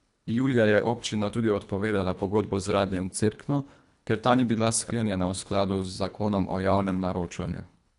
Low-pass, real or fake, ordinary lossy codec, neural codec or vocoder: 10.8 kHz; fake; none; codec, 24 kHz, 1.5 kbps, HILCodec